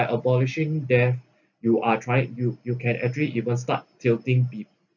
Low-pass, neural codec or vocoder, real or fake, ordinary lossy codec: 7.2 kHz; none; real; none